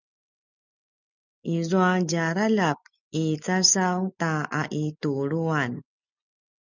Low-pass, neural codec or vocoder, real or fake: 7.2 kHz; none; real